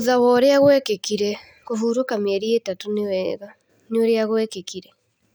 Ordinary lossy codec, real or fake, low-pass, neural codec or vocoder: none; real; none; none